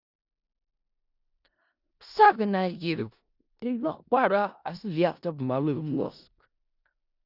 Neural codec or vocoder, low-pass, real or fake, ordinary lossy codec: codec, 16 kHz in and 24 kHz out, 0.4 kbps, LongCat-Audio-Codec, four codebook decoder; 5.4 kHz; fake; Opus, 64 kbps